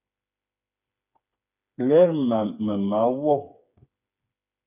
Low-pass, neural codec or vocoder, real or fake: 3.6 kHz; codec, 16 kHz, 4 kbps, FreqCodec, smaller model; fake